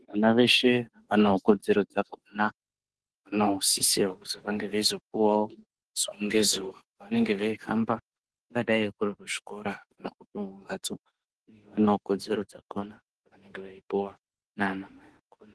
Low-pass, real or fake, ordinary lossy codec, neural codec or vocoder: 10.8 kHz; fake; Opus, 16 kbps; autoencoder, 48 kHz, 32 numbers a frame, DAC-VAE, trained on Japanese speech